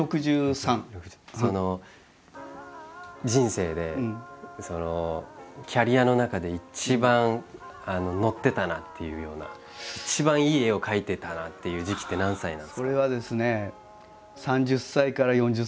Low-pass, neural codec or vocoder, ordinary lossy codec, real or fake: none; none; none; real